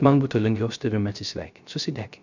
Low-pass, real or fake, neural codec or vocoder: 7.2 kHz; fake; codec, 16 kHz, 0.3 kbps, FocalCodec